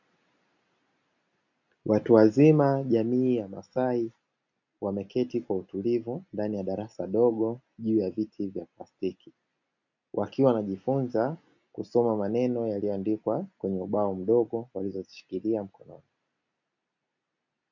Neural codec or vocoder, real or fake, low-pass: none; real; 7.2 kHz